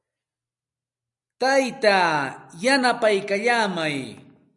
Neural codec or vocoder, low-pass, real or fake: none; 10.8 kHz; real